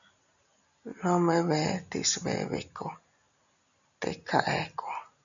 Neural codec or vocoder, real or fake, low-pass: none; real; 7.2 kHz